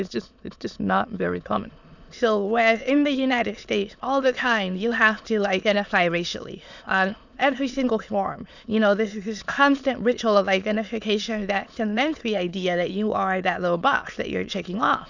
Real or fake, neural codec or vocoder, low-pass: fake; autoencoder, 22.05 kHz, a latent of 192 numbers a frame, VITS, trained on many speakers; 7.2 kHz